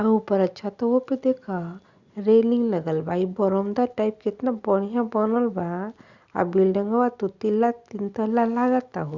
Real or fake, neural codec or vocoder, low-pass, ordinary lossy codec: real; none; 7.2 kHz; Opus, 64 kbps